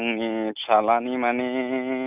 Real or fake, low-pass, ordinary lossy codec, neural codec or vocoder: real; 3.6 kHz; none; none